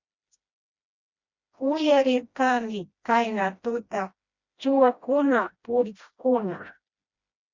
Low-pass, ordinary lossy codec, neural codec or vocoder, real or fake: 7.2 kHz; Opus, 64 kbps; codec, 16 kHz, 1 kbps, FreqCodec, smaller model; fake